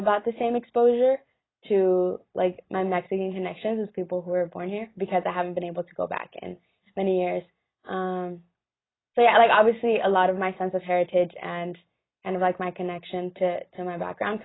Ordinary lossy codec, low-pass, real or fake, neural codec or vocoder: AAC, 16 kbps; 7.2 kHz; real; none